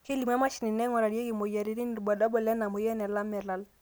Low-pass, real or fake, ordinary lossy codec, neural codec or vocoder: none; real; none; none